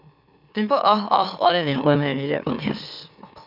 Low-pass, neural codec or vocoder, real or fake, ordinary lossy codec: 5.4 kHz; autoencoder, 44.1 kHz, a latent of 192 numbers a frame, MeloTTS; fake; none